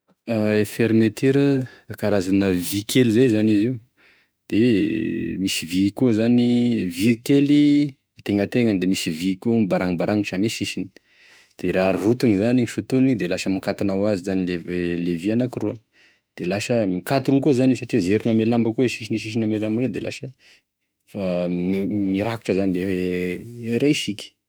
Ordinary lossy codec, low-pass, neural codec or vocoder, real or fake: none; none; autoencoder, 48 kHz, 32 numbers a frame, DAC-VAE, trained on Japanese speech; fake